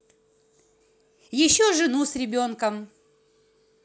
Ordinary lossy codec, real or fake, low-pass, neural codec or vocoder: none; real; none; none